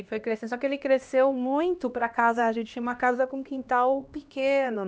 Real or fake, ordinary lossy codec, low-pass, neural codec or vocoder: fake; none; none; codec, 16 kHz, 1 kbps, X-Codec, HuBERT features, trained on LibriSpeech